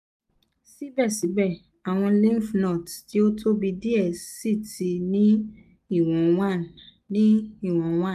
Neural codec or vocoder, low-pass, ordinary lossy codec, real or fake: none; 14.4 kHz; none; real